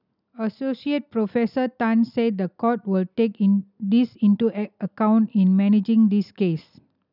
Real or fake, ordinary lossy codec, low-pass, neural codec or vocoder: real; none; 5.4 kHz; none